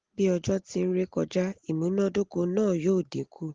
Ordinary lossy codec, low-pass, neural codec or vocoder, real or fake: Opus, 16 kbps; 7.2 kHz; none; real